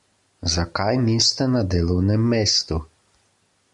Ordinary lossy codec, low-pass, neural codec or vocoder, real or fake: MP3, 64 kbps; 10.8 kHz; none; real